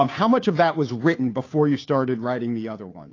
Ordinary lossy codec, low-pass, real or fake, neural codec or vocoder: AAC, 32 kbps; 7.2 kHz; fake; codec, 16 kHz, 2 kbps, FunCodec, trained on Chinese and English, 25 frames a second